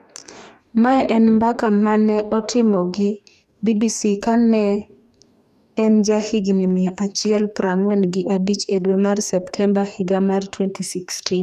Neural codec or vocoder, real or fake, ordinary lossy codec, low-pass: codec, 44.1 kHz, 2.6 kbps, DAC; fake; none; 14.4 kHz